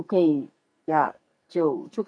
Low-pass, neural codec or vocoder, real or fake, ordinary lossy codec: 9.9 kHz; codec, 44.1 kHz, 2.6 kbps, SNAC; fake; none